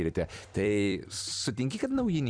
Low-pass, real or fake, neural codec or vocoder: 9.9 kHz; fake; vocoder, 44.1 kHz, 128 mel bands every 256 samples, BigVGAN v2